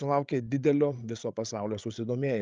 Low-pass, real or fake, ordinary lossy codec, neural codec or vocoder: 7.2 kHz; fake; Opus, 24 kbps; codec, 16 kHz, 8 kbps, FreqCodec, larger model